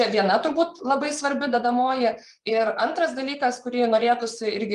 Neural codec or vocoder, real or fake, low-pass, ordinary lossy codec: vocoder, 24 kHz, 100 mel bands, Vocos; fake; 10.8 kHz; Opus, 32 kbps